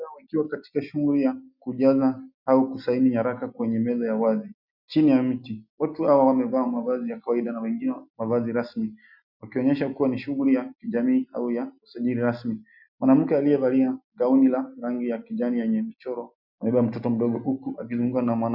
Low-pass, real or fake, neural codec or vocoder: 5.4 kHz; real; none